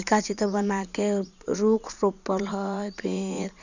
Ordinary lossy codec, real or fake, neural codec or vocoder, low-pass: none; fake; vocoder, 44.1 kHz, 80 mel bands, Vocos; 7.2 kHz